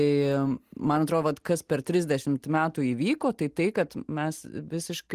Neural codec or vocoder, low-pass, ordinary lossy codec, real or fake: none; 14.4 kHz; Opus, 24 kbps; real